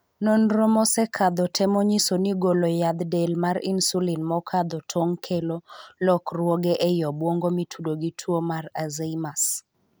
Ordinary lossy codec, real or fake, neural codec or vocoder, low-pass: none; real; none; none